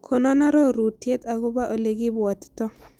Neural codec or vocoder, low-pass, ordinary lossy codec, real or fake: autoencoder, 48 kHz, 128 numbers a frame, DAC-VAE, trained on Japanese speech; 19.8 kHz; Opus, 32 kbps; fake